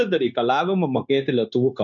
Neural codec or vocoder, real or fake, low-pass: codec, 16 kHz, 0.9 kbps, LongCat-Audio-Codec; fake; 7.2 kHz